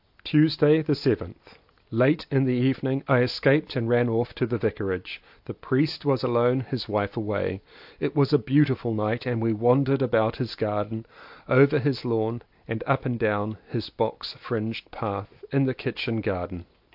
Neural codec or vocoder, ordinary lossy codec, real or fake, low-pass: none; AAC, 48 kbps; real; 5.4 kHz